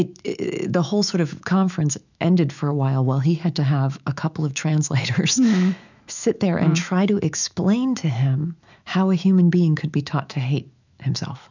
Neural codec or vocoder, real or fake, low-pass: autoencoder, 48 kHz, 128 numbers a frame, DAC-VAE, trained on Japanese speech; fake; 7.2 kHz